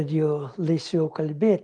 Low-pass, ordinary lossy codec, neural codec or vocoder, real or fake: 9.9 kHz; Opus, 32 kbps; none; real